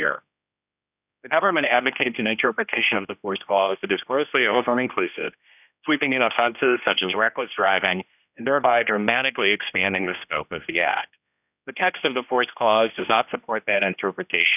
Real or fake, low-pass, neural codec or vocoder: fake; 3.6 kHz; codec, 16 kHz, 1 kbps, X-Codec, HuBERT features, trained on general audio